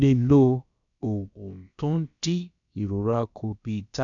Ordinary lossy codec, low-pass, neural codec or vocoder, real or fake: none; 7.2 kHz; codec, 16 kHz, about 1 kbps, DyCAST, with the encoder's durations; fake